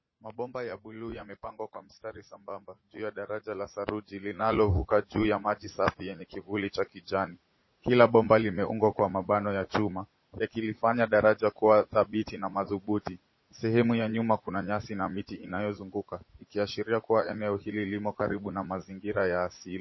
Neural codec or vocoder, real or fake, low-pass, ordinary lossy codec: vocoder, 44.1 kHz, 80 mel bands, Vocos; fake; 7.2 kHz; MP3, 24 kbps